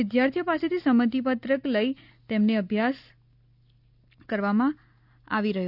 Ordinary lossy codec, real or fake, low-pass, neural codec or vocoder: none; real; 5.4 kHz; none